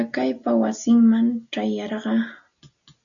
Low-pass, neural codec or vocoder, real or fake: 7.2 kHz; none; real